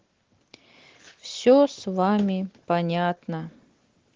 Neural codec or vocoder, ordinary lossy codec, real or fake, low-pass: none; Opus, 16 kbps; real; 7.2 kHz